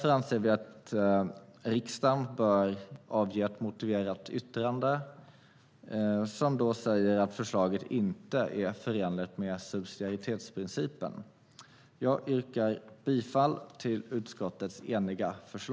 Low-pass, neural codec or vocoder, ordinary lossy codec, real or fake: none; none; none; real